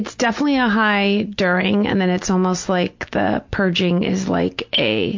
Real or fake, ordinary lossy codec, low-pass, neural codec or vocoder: real; MP3, 48 kbps; 7.2 kHz; none